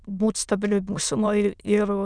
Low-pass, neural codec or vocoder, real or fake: 9.9 kHz; autoencoder, 22.05 kHz, a latent of 192 numbers a frame, VITS, trained on many speakers; fake